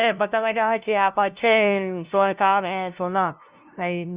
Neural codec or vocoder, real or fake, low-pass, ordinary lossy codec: codec, 16 kHz, 0.5 kbps, FunCodec, trained on LibriTTS, 25 frames a second; fake; 3.6 kHz; Opus, 64 kbps